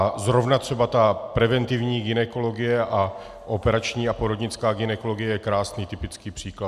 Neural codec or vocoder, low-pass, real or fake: none; 14.4 kHz; real